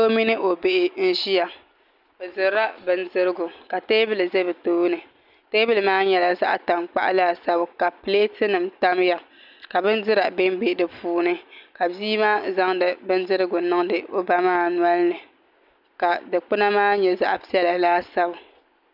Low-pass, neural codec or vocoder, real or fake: 5.4 kHz; none; real